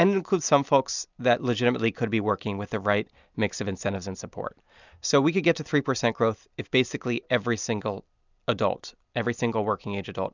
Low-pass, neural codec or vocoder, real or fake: 7.2 kHz; none; real